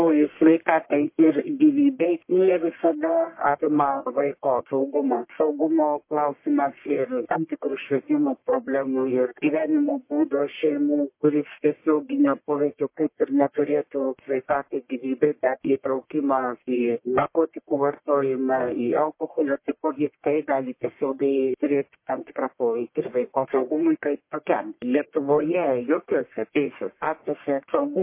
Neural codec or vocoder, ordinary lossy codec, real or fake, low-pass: codec, 44.1 kHz, 1.7 kbps, Pupu-Codec; MP3, 24 kbps; fake; 3.6 kHz